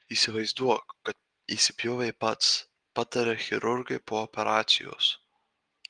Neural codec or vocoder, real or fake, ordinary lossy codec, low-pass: none; real; Opus, 24 kbps; 9.9 kHz